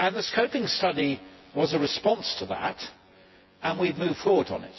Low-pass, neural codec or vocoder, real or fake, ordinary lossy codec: 7.2 kHz; vocoder, 24 kHz, 100 mel bands, Vocos; fake; MP3, 24 kbps